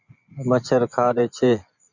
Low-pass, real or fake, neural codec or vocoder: 7.2 kHz; fake; vocoder, 24 kHz, 100 mel bands, Vocos